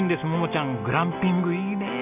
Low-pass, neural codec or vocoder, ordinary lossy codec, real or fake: 3.6 kHz; none; none; real